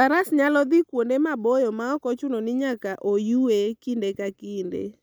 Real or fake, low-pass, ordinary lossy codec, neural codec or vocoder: real; none; none; none